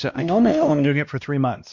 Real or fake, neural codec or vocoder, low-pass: fake; codec, 16 kHz, 1 kbps, X-Codec, WavLM features, trained on Multilingual LibriSpeech; 7.2 kHz